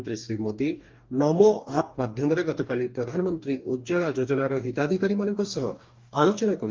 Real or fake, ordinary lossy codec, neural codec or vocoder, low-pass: fake; Opus, 24 kbps; codec, 44.1 kHz, 2.6 kbps, DAC; 7.2 kHz